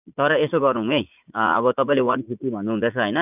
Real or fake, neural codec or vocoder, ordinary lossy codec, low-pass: fake; vocoder, 44.1 kHz, 80 mel bands, Vocos; none; 3.6 kHz